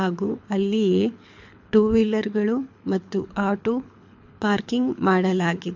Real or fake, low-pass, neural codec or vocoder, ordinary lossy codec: fake; 7.2 kHz; codec, 24 kHz, 6 kbps, HILCodec; MP3, 48 kbps